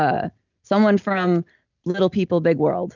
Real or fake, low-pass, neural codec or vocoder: real; 7.2 kHz; none